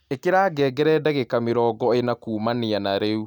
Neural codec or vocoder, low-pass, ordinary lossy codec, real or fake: none; 19.8 kHz; none; real